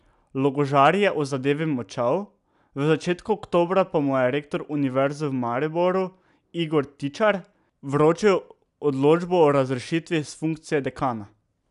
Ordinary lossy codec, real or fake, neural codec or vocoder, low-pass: none; real; none; 10.8 kHz